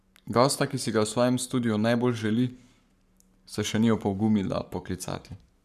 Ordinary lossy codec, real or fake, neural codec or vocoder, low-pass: none; fake; codec, 44.1 kHz, 7.8 kbps, Pupu-Codec; 14.4 kHz